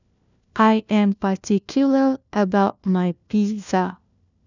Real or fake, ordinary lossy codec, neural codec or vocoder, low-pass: fake; none; codec, 16 kHz, 1 kbps, FunCodec, trained on LibriTTS, 50 frames a second; 7.2 kHz